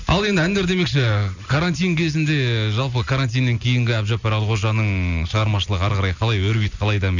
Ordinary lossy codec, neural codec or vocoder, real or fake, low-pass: none; none; real; 7.2 kHz